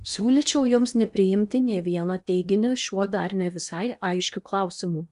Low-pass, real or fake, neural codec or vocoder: 10.8 kHz; fake; codec, 16 kHz in and 24 kHz out, 0.8 kbps, FocalCodec, streaming, 65536 codes